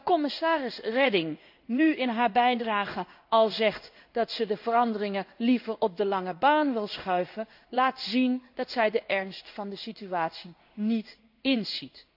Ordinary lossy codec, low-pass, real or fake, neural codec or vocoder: AAC, 48 kbps; 5.4 kHz; fake; codec, 16 kHz in and 24 kHz out, 1 kbps, XY-Tokenizer